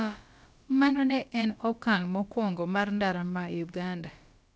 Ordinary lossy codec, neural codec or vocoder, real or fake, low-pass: none; codec, 16 kHz, about 1 kbps, DyCAST, with the encoder's durations; fake; none